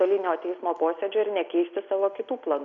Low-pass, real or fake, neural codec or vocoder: 7.2 kHz; real; none